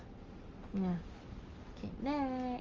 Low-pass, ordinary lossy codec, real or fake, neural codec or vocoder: 7.2 kHz; Opus, 32 kbps; real; none